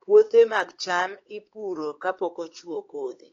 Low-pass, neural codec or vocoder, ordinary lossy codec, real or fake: 7.2 kHz; codec, 16 kHz, 4 kbps, X-Codec, HuBERT features, trained on LibriSpeech; AAC, 32 kbps; fake